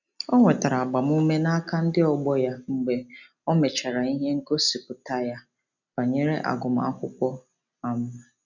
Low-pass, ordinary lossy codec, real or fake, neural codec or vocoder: 7.2 kHz; none; real; none